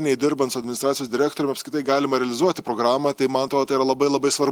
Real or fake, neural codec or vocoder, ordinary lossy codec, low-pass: real; none; Opus, 24 kbps; 19.8 kHz